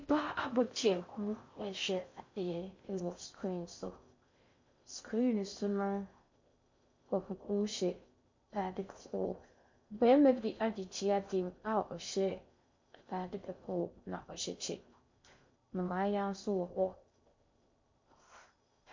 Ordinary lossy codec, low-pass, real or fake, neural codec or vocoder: MP3, 48 kbps; 7.2 kHz; fake; codec, 16 kHz in and 24 kHz out, 0.6 kbps, FocalCodec, streaming, 4096 codes